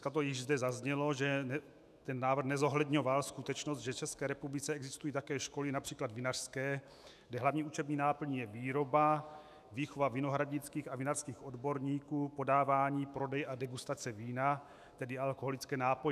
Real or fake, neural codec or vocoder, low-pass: fake; autoencoder, 48 kHz, 128 numbers a frame, DAC-VAE, trained on Japanese speech; 14.4 kHz